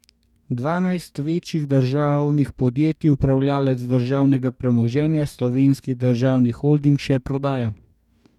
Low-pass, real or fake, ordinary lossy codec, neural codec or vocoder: 19.8 kHz; fake; none; codec, 44.1 kHz, 2.6 kbps, DAC